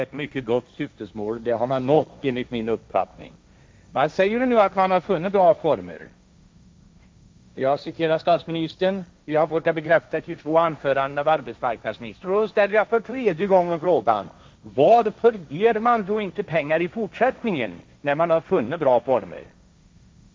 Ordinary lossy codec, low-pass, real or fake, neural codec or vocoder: none; none; fake; codec, 16 kHz, 1.1 kbps, Voila-Tokenizer